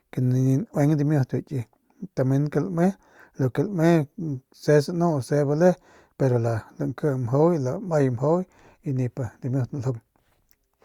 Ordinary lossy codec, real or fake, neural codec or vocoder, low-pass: Opus, 64 kbps; real; none; 19.8 kHz